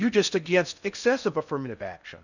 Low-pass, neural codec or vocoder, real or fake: 7.2 kHz; codec, 16 kHz in and 24 kHz out, 0.6 kbps, FocalCodec, streaming, 4096 codes; fake